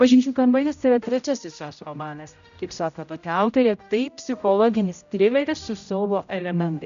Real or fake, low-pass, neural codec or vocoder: fake; 7.2 kHz; codec, 16 kHz, 0.5 kbps, X-Codec, HuBERT features, trained on general audio